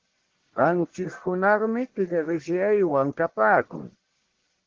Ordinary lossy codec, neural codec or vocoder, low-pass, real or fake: Opus, 16 kbps; codec, 44.1 kHz, 1.7 kbps, Pupu-Codec; 7.2 kHz; fake